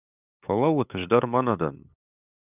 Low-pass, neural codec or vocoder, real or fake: 3.6 kHz; codec, 16 kHz, 8 kbps, FunCodec, trained on LibriTTS, 25 frames a second; fake